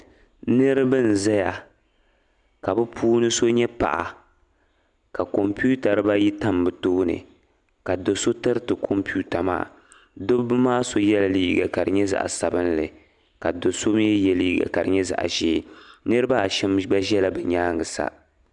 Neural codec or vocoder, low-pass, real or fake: none; 10.8 kHz; real